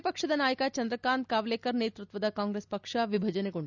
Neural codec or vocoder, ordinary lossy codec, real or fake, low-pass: none; none; real; 7.2 kHz